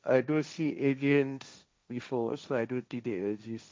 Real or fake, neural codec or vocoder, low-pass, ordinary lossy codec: fake; codec, 16 kHz, 1.1 kbps, Voila-Tokenizer; none; none